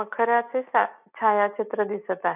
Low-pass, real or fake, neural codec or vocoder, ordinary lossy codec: 3.6 kHz; real; none; none